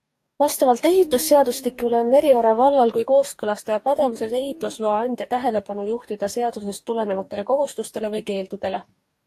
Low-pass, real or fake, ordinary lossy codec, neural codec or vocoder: 14.4 kHz; fake; AAC, 64 kbps; codec, 44.1 kHz, 2.6 kbps, DAC